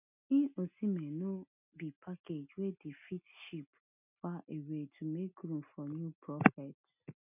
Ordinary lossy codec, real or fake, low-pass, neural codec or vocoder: none; real; 3.6 kHz; none